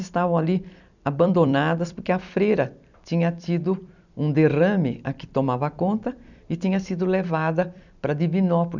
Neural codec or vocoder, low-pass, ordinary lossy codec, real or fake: vocoder, 44.1 kHz, 128 mel bands every 256 samples, BigVGAN v2; 7.2 kHz; none; fake